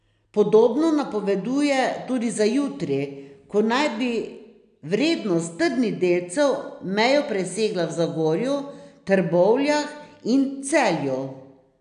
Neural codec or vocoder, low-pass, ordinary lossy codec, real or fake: none; 9.9 kHz; none; real